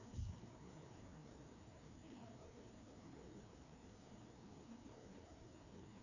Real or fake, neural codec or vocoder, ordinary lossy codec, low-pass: fake; codec, 16 kHz, 2 kbps, FreqCodec, larger model; none; 7.2 kHz